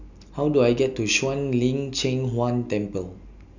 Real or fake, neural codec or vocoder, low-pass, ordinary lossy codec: real; none; 7.2 kHz; none